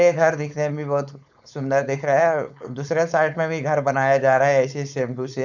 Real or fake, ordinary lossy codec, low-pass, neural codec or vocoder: fake; none; 7.2 kHz; codec, 16 kHz, 4.8 kbps, FACodec